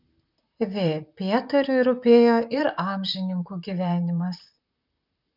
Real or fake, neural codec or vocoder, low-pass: fake; vocoder, 44.1 kHz, 128 mel bands, Pupu-Vocoder; 5.4 kHz